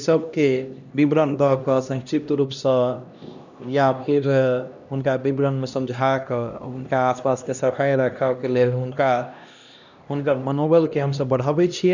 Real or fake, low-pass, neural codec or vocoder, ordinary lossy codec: fake; 7.2 kHz; codec, 16 kHz, 1 kbps, X-Codec, HuBERT features, trained on LibriSpeech; none